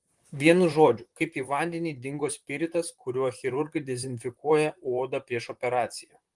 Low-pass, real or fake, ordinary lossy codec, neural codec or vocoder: 10.8 kHz; fake; Opus, 24 kbps; vocoder, 44.1 kHz, 128 mel bands, Pupu-Vocoder